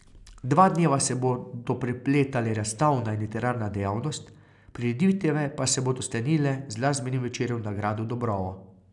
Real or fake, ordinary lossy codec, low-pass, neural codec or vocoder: real; none; 10.8 kHz; none